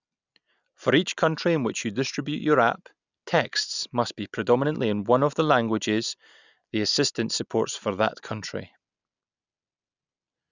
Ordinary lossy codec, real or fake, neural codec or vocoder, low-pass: none; real; none; 7.2 kHz